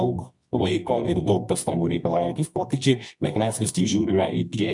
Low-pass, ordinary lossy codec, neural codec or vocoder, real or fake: 10.8 kHz; MP3, 64 kbps; codec, 24 kHz, 0.9 kbps, WavTokenizer, medium music audio release; fake